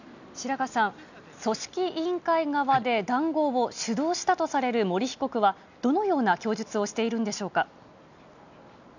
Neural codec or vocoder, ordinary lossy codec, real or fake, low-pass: none; none; real; 7.2 kHz